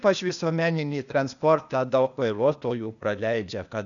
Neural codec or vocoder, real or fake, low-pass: codec, 16 kHz, 0.8 kbps, ZipCodec; fake; 7.2 kHz